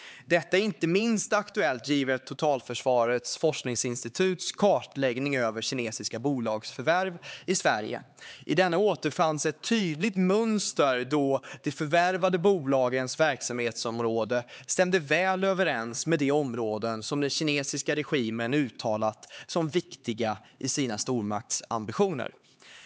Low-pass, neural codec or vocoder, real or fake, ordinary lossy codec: none; codec, 16 kHz, 4 kbps, X-Codec, HuBERT features, trained on LibriSpeech; fake; none